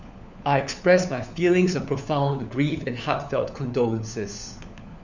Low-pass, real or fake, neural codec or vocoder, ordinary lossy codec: 7.2 kHz; fake; codec, 16 kHz, 4 kbps, FunCodec, trained on LibriTTS, 50 frames a second; none